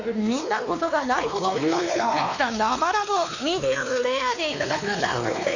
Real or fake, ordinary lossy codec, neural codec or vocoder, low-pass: fake; none; codec, 16 kHz, 2 kbps, X-Codec, WavLM features, trained on Multilingual LibriSpeech; 7.2 kHz